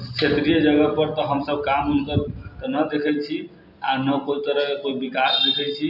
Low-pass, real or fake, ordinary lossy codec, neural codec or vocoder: 5.4 kHz; real; none; none